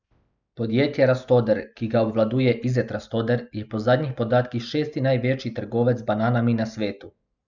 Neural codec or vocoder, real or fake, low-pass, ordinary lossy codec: none; real; 7.2 kHz; none